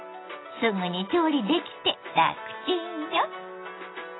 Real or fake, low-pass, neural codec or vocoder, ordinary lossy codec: real; 7.2 kHz; none; AAC, 16 kbps